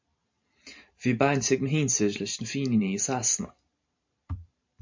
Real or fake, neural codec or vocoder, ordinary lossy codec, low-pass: fake; vocoder, 44.1 kHz, 128 mel bands every 512 samples, BigVGAN v2; MP3, 48 kbps; 7.2 kHz